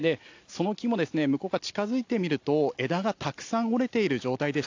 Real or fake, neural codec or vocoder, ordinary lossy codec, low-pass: real; none; AAC, 48 kbps; 7.2 kHz